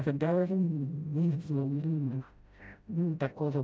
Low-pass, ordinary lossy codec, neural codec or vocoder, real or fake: none; none; codec, 16 kHz, 0.5 kbps, FreqCodec, smaller model; fake